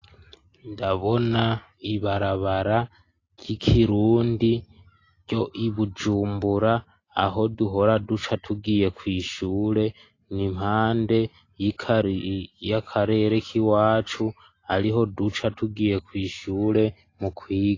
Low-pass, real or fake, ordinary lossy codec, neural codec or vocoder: 7.2 kHz; real; AAC, 32 kbps; none